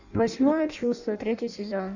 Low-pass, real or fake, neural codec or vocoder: 7.2 kHz; fake; codec, 16 kHz in and 24 kHz out, 0.6 kbps, FireRedTTS-2 codec